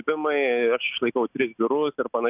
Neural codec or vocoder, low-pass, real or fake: none; 3.6 kHz; real